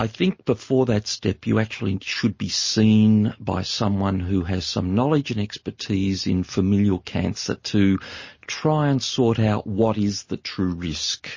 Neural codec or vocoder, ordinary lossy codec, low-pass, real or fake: none; MP3, 32 kbps; 7.2 kHz; real